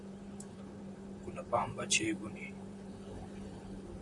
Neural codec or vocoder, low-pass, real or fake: vocoder, 44.1 kHz, 128 mel bands, Pupu-Vocoder; 10.8 kHz; fake